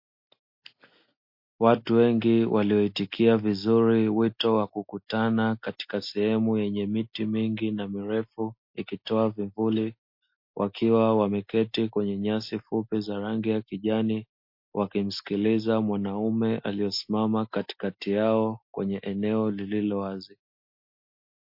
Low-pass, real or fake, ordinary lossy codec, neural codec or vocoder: 5.4 kHz; real; MP3, 32 kbps; none